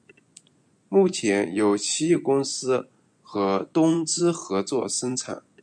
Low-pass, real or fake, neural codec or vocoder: 9.9 kHz; fake; vocoder, 24 kHz, 100 mel bands, Vocos